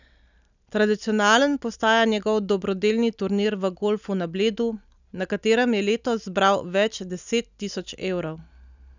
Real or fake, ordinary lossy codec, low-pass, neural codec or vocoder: real; none; 7.2 kHz; none